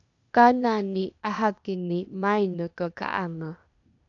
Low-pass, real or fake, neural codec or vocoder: 7.2 kHz; fake; codec, 16 kHz, 0.8 kbps, ZipCodec